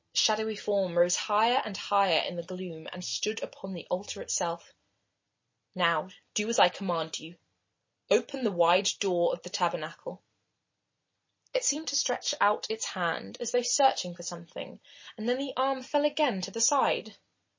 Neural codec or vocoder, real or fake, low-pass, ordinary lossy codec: none; real; 7.2 kHz; MP3, 32 kbps